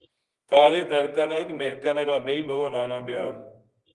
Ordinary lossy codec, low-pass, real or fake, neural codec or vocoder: Opus, 24 kbps; 10.8 kHz; fake; codec, 24 kHz, 0.9 kbps, WavTokenizer, medium music audio release